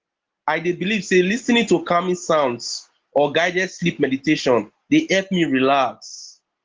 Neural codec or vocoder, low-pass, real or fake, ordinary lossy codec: none; 7.2 kHz; real; Opus, 16 kbps